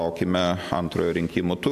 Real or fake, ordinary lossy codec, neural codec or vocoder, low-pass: real; AAC, 96 kbps; none; 14.4 kHz